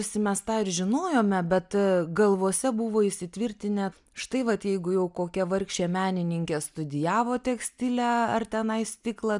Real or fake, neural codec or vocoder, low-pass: real; none; 10.8 kHz